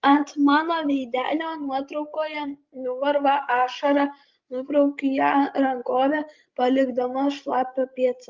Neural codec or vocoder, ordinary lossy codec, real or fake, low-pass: codec, 16 kHz, 16 kbps, FreqCodec, larger model; Opus, 32 kbps; fake; 7.2 kHz